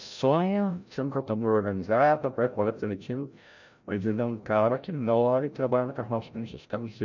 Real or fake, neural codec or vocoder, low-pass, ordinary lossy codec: fake; codec, 16 kHz, 0.5 kbps, FreqCodec, larger model; 7.2 kHz; none